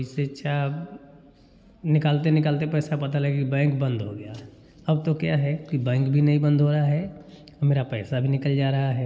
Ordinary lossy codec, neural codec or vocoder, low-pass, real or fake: none; none; none; real